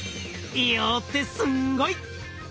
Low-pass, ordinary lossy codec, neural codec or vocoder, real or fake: none; none; none; real